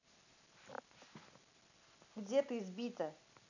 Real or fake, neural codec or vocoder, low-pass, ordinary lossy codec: real; none; 7.2 kHz; none